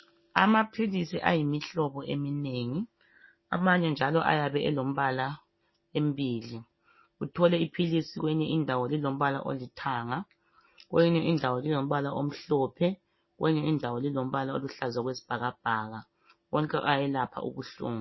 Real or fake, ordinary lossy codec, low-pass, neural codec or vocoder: real; MP3, 24 kbps; 7.2 kHz; none